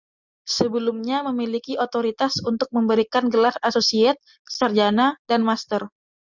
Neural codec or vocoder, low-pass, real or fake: none; 7.2 kHz; real